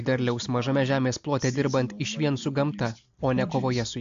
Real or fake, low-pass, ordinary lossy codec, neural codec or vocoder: real; 7.2 kHz; AAC, 64 kbps; none